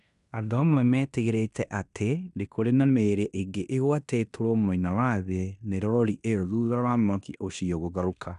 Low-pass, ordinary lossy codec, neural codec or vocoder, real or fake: 10.8 kHz; none; codec, 16 kHz in and 24 kHz out, 0.9 kbps, LongCat-Audio-Codec, fine tuned four codebook decoder; fake